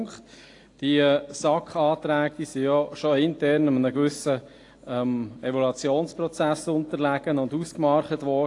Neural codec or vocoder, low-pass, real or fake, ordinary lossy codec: none; 10.8 kHz; real; AAC, 48 kbps